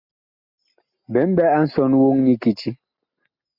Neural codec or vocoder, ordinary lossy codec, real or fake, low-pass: none; MP3, 48 kbps; real; 5.4 kHz